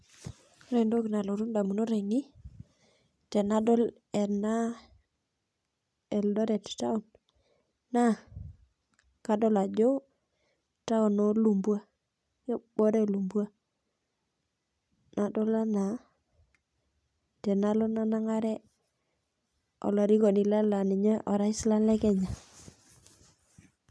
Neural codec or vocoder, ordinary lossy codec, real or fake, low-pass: none; none; real; none